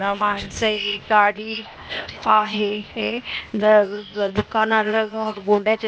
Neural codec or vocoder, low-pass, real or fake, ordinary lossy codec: codec, 16 kHz, 0.8 kbps, ZipCodec; none; fake; none